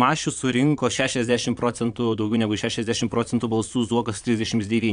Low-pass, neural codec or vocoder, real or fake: 9.9 kHz; vocoder, 22.05 kHz, 80 mel bands, Vocos; fake